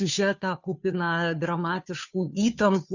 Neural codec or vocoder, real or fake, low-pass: codec, 16 kHz, 2 kbps, FunCodec, trained on Chinese and English, 25 frames a second; fake; 7.2 kHz